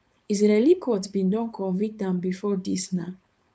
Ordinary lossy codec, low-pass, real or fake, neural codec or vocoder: none; none; fake; codec, 16 kHz, 4.8 kbps, FACodec